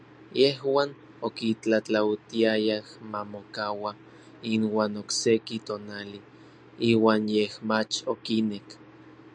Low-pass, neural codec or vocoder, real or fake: 9.9 kHz; none; real